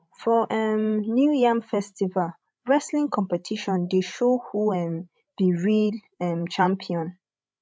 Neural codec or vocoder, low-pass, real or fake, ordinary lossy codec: codec, 16 kHz, 16 kbps, FreqCodec, larger model; none; fake; none